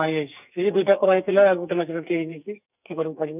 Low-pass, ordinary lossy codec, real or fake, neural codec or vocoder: 3.6 kHz; none; fake; codec, 44.1 kHz, 2.6 kbps, SNAC